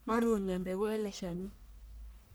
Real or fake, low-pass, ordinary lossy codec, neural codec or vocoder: fake; none; none; codec, 44.1 kHz, 1.7 kbps, Pupu-Codec